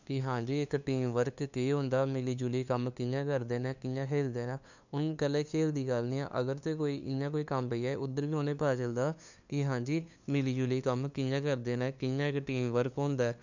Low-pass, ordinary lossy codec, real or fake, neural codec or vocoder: 7.2 kHz; none; fake; codec, 16 kHz, 2 kbps, FunCodec, trained on LibriTTS, 25 frames a second